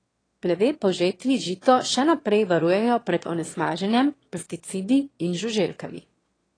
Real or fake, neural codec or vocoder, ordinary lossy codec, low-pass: fake; autoencoder, 22.05 kHz, a latent of 192 numbers a frame, VITS, trained on one speaker; AAC, 32 kbps; 9.9 kHz